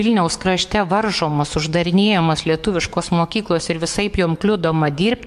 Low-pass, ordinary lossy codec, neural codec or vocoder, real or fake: 14.4 kHz; MP3, 64 kbps; codec, 44.1 kHz, 7.8 kbps, DAC; fake